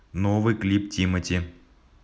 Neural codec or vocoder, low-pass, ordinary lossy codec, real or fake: none; none; none; real